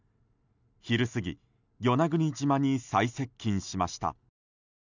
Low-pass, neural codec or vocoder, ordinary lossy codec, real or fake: 7.2 kHz; none; none; real